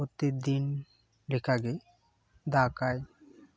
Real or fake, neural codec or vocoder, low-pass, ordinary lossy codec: real; none; none; none